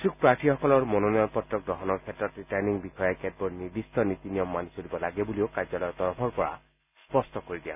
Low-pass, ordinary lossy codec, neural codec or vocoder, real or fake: 3.6 kHz; none; none; real